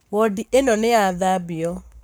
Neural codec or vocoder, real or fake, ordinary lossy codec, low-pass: codec, 44.1 kHz, 7.8 kbps, Pupu-Codec; fake; none; none